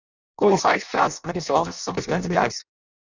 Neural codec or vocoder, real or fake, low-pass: codec, 16 kHz in and 24 kHz out, 0.6 kbps, FireRedTTS-2 codec; fake; 7.2 kHz